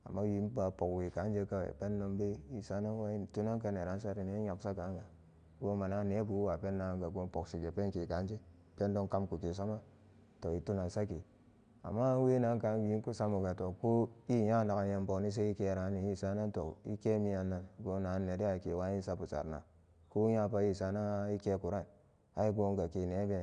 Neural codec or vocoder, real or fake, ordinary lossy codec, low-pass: none; real; none; 9.9 kHz